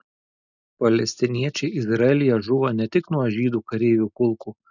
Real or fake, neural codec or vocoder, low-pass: real; none; 7.2 kHz